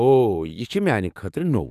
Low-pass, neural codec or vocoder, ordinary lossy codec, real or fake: 14.4 kHz; codec, 44.1 kHz, 7.8 kbps, DAC; none; fake